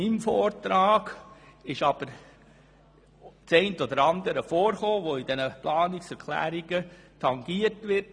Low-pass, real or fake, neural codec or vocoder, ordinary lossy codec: 9.9 kHz; real; none; none